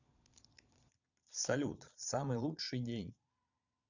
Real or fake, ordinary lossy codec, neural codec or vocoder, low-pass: fake; none; vocoder, 22.05 kHz, 80 mel bands, Vocos; 7.2 kHz